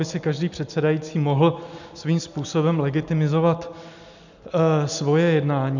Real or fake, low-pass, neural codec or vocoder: real; 7.2 kHz; none